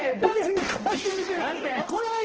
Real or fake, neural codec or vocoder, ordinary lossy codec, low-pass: fake; codec, 16 kHz, 1 kbps, X-Codec, HuBERT features, trained on balanced general audio; Opus, 16 kbps; 7.2 kHz